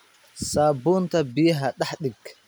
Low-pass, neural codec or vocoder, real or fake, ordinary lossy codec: none; none; real; none